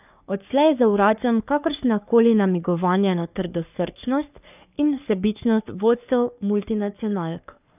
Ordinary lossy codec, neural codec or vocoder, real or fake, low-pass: none; codec, 44.1 kHz, 3.4 kbps, Pupu-Codec; fake; 3.6 kHz